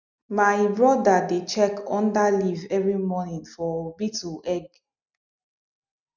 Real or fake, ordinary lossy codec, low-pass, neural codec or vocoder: real; none; 7.2 kHz; none